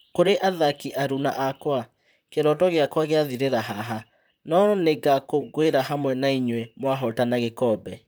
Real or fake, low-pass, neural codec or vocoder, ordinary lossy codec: fake; none; vocoder, 44.1 kHz, 128 mel bands, Pupu-Vocoder; none